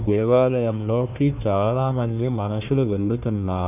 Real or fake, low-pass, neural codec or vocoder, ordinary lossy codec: fake; 3.6 kHz; codec, 16 kHz, 1 kbps, FunCodec, trained on Chinese and English, 50 frames a second; none